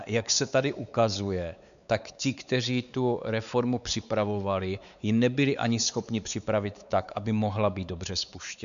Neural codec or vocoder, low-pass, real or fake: codec, 16 kHz, 4 kbps, X-Codec, WavLM features, trained on Multilingual LibriSpeech; 7.2 kHz; fake